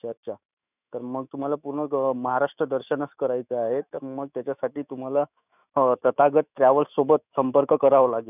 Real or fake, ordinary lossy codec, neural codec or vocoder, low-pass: fake; none; autoencoder, 48 kHz, 128 numbers a frame, DAC-VAE, trained on Japanese speech; 3.6 kHz